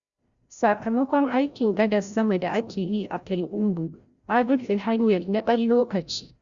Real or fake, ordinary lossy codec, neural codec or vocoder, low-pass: fake; Opus, 64 kbps; codec, 16 kHz, 0.5 kbps, FreqCodec, larger model; 7.2 kHz